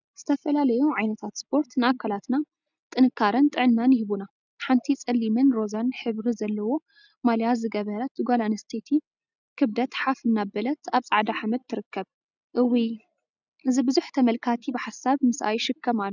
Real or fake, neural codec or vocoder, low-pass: real; none; 7.2 kHz